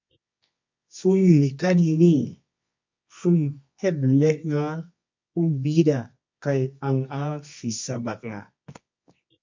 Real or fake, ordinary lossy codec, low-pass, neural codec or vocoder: fake; MP3, 48 kbps; 7.2 kHz; codec, 24 kHz, 0.9 kbps, WavTokenizer, medium music audio release